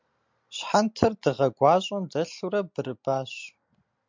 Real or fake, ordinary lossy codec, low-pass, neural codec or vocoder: real; MP3, 64 kbps; 7.2 kHz; none